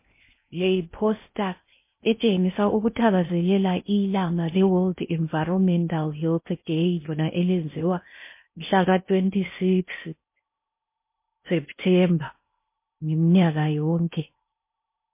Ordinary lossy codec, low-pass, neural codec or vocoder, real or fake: MP3, 24 kbps; 3.6 kHz; codec, 16 kHz in and 24 kHz out, 0.6 kbps, FocalCodec, streaming, 2048 codes; fake